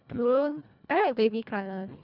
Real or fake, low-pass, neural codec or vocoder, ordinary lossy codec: fake; 5.4 kHz; codec, 24 kHz, 1.5 kbps, HILCodec; none